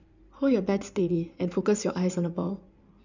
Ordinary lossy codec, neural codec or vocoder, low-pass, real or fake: none; codec, 16 kHz in and 24 kHz out, 2.2 kbps, FireRedTTS-2 codec; 7.2 kHz; fake